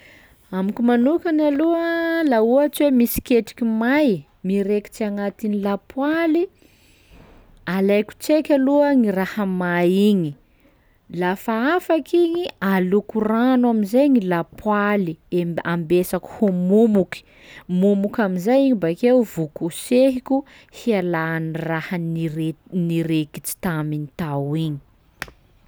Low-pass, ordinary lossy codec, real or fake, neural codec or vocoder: none; none; real; none